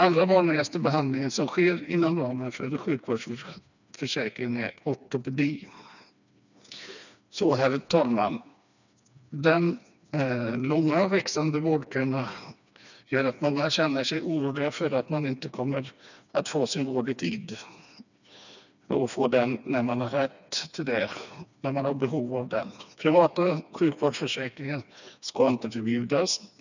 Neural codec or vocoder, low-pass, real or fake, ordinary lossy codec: codec, 16 kHz, 2 kbps, FreqCodec, smaller model; 7.2 kHz; fake; none